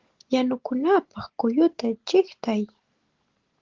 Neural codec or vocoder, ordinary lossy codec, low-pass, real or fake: none; Opus, 16 kbps; 7.2 kHz; real